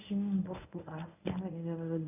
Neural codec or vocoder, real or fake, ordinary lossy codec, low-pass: codec, 24 kHz, 0.9 kbps, WavTokenizer, medium speech release version 1; fake; none; 3.6 kHz